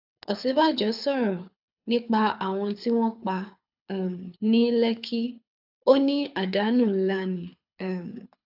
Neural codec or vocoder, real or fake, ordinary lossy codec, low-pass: codec, 24 kHz, 6 kbps, HILCodec; fake; AAC, 48 kbps; 5.4 kHz